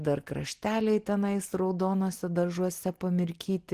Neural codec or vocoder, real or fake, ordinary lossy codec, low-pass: none; real; Opus, 16 kbps; 14.4 kHz